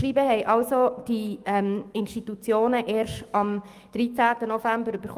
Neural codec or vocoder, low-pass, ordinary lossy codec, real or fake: autoencoder, 48 kHz, 128 numbers a frame, DAC-VAE, trained on Japanese speech; 14.4 kHz; Opus, 32 kbps; fake